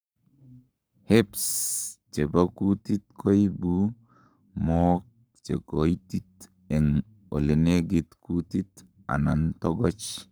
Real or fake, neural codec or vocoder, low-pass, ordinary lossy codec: fake; codec, 44.1 kHz, 7.8 kbps, Pupu-Codec; none; none